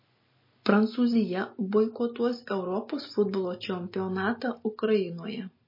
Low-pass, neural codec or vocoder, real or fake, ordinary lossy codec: 5.4 kHz; none; real; MP3, 24 kbps